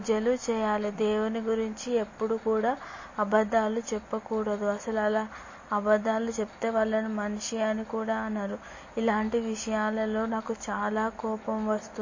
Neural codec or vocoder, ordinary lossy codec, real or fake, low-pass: none; MP3, 32 kbps; real; 7.2 kHz